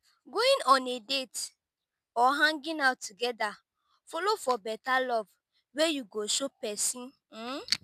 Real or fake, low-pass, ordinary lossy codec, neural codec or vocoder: real; 14.4 kHz; none; none